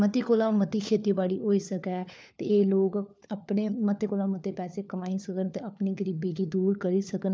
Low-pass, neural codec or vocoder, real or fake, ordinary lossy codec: none; codec, 16 kHz, 4 kbps, FunCodec, trained on LibriTTS, 50 frames a second; fake; none